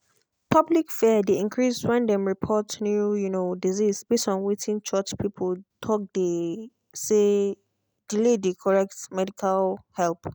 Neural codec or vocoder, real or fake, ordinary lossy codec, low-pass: none; real; none; none